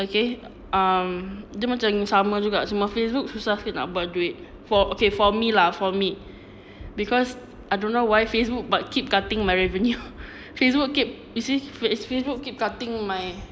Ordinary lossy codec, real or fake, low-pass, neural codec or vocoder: none; real; none; none